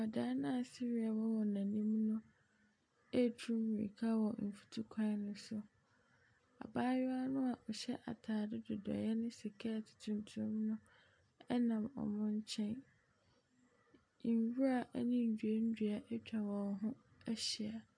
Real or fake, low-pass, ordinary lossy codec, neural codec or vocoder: real; 9.9 kHz; MP3, 64 kbps; none